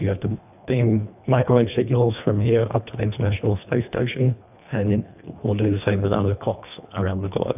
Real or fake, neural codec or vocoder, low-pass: fake; codec, 24 kHz, 1.5 kbps, HILCodec; 3.6 kHz